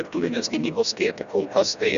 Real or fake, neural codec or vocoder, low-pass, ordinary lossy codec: fake; codec, 16 kHz, 1 kbps, FreqCodec, smaller model; 7.2 kHz; Opus, 64 kbps